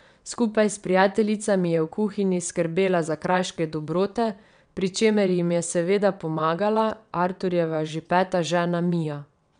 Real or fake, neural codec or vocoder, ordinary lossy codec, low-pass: fake; vocoder, 22.05 kHz, 80 mel bands, WaveNeXt; none; 9.9 kHz